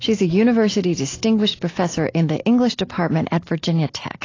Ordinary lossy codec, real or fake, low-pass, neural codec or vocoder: AAC, 32 kbps; real; 7.2 kHz; none